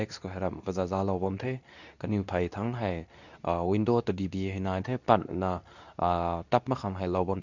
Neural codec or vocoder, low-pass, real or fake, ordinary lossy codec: codec, 24 kHz, 0.9 kbps, WavTokenizer, medium speech release version 2; 7.2 kHz; fake; none